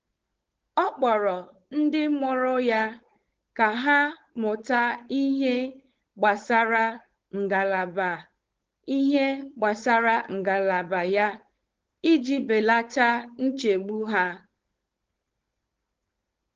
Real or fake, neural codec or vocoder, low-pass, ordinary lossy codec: fake; codec, 16 kHz, 4.8 kbps, FACodec; 7.2 kHz; Opus, 16 kbps